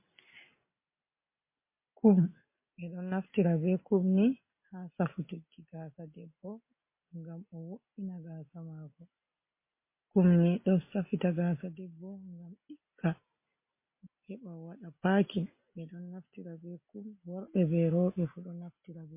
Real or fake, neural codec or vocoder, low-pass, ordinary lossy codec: real; none; 3.6 kHz; MP3, 24 kbps